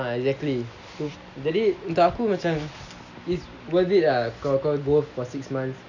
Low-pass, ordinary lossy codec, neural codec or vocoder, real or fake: 7.2 kHz; none; none; real